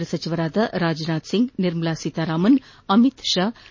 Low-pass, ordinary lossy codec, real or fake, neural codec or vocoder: 7.2 kHz; MP3, 32 kbps; real; none